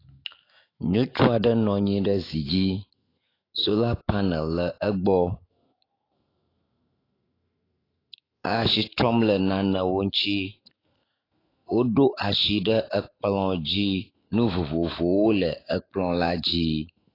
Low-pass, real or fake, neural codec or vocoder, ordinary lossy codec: 5.4 kHz; fake; autoencoder, 48 kHz, 128 numbers a frame, DAC-VAE, trained on Japanese speech; AAC, 24 kbps